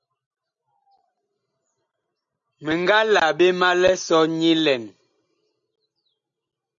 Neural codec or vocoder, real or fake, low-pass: none; real; 7.2 kHz